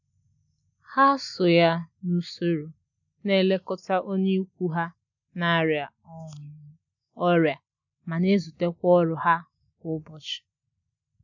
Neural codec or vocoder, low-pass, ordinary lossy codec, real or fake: none; 7.2 kHz; none; real